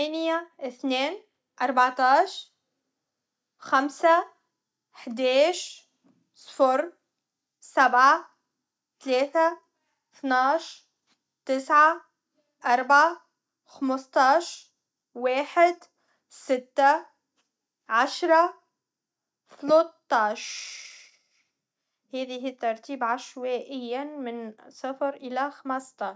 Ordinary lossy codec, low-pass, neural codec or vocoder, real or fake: none; none; none; real